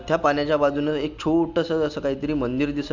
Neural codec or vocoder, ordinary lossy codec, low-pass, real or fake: none; none; 7.2 kHz; real